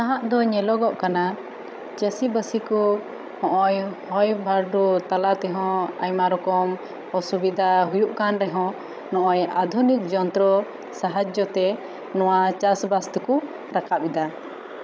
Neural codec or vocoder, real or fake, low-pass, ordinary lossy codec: codec, 16 kHz, 16 kbps, FreqCodec, larger model; fake; none; none